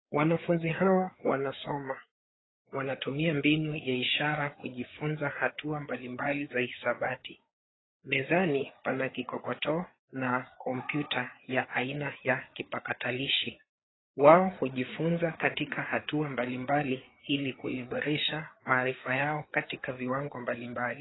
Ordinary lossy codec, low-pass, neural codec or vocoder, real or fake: AAC, 16 kbps; 7.2 kHz; codec, 16 kHz, 4 kbps, FreqCodec, larger model; fake